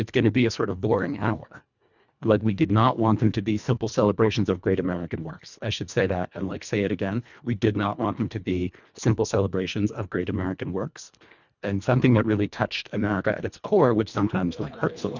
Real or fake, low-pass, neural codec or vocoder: fake; 7.2 kHz; codec, 24 kHz, 1.5 kbps, HILCodec